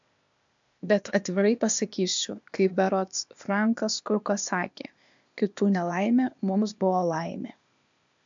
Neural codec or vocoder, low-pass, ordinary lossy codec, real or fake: codec, 16 kHz, 0.8 kbps, ZipCodec; 7.2 kHz; MP3, 64 kbps; fake